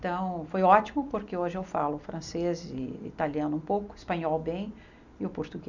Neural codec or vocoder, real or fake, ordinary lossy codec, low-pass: none; real; none; 7.2 kHz